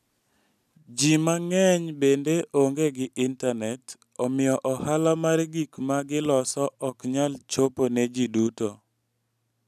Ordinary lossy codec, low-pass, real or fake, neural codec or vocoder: none; 14.4 kHz; real; none